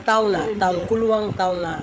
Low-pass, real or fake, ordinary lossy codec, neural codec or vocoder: none; fake; none; codec, 16 kHz, 8 kbps, FreqCodec, larger model